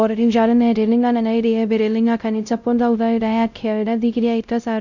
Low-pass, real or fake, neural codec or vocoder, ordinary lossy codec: 7.2 kHz; fake; codec, 16 kHz, 0.5 kbps, X-Codec, WavLM features, trained on Multilingual LibriSpeech; none